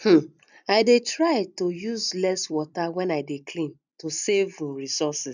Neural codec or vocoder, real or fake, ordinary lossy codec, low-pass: vocoder, 44.1 kHz, 128 mel bands every 256 samples, BigVGAN v2; fake; none; 7.2 kHz